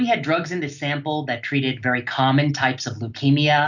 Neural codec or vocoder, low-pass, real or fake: none; 7.2 kHz; real